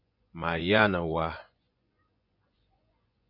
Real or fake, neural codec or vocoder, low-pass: fake; vocoder, 24 kHz, 100 mel bands, Vocos; 5.4 kHz